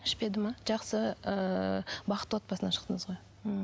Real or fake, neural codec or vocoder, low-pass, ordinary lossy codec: real; none; none; none